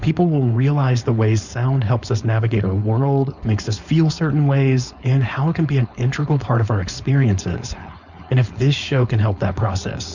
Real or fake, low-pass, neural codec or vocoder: fake; 7.2 kHz; codec, 16 kHz, 4.8 kbps, FACodec